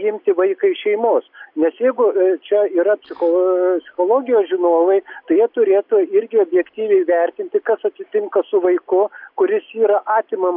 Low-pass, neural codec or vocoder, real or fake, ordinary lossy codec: 5.4 kHz; none; real; AAC, 48 kbps